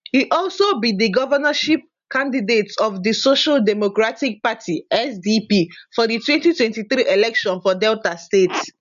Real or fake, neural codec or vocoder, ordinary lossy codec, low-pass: real; none; AAC, 96 kbps; 7.2 kHz